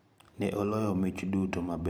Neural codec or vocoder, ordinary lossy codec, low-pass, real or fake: vocoder, 44.1 kHz, 128 mel bands every 512 samples, BigVGAN v2; none; none; fake